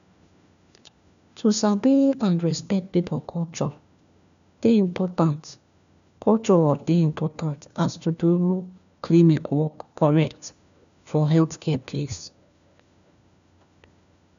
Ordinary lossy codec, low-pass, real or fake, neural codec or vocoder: none; 7.2 kHz; fake; codec, 16 kHz, 1 kbps, FunCodec, trained on LibriTTS, 50 frames a second